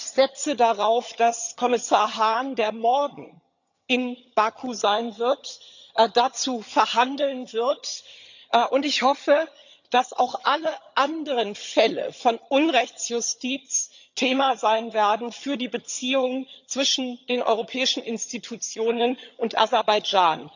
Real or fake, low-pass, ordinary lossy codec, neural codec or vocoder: fake; 7.2 kHz; none; vocoder, 22.05 kHz, 80 mel bands, HiFi-GAN